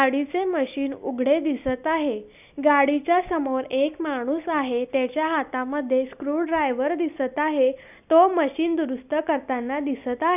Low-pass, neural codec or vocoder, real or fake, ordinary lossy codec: 3.6 kHz; none; real; none